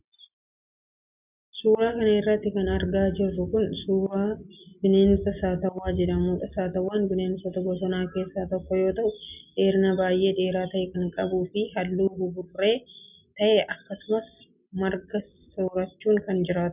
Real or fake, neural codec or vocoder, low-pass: real; none; 3.6 kHz